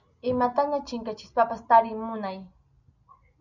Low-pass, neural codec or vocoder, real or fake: 7.2 kHz; none; real